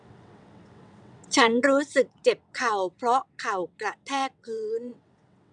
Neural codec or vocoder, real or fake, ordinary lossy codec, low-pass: vocoder, 22.05 kHz, 80 mel bands, WaveNeXt; fake; none; 9.9 kHz